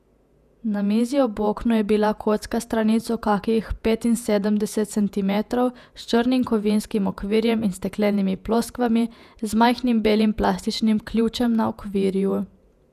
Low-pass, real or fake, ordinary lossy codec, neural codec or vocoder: 14.4 kHz; fake; none; vocoder, 48 kHz, 128 mel bands, Vocos